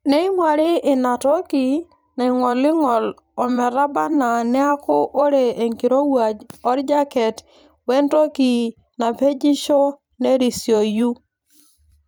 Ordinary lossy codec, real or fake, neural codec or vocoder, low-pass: none; fake; vocoder, 44.1 kHz, 128 mel bands every 512 samples, BigVGAN v2; none